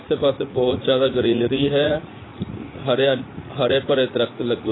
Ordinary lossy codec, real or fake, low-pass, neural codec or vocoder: AAC, 16 kbps; fake; 7.2 kHz; vocoder, 44.1 kHz, 80 mel bands, Vocos